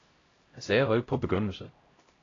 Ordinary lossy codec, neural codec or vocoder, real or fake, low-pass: AAC, 32 kbps; codec, 16 kHz, 0.5 kbps, X-Codec, HuBERT features, trained on LibriSpeech; fake; 7.2 kHz